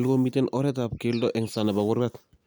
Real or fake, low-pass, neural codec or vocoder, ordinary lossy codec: real; none; none; none